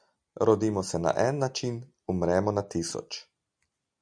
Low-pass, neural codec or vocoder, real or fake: 9.9 kHz; none; real